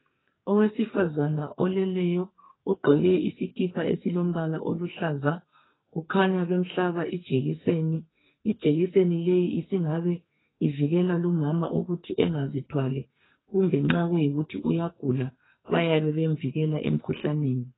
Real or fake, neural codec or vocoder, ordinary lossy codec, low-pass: fake; codec, 44.1 kHz, 2.6 kbps, SNAC; AAC, 16 kbps; 7.2 kHz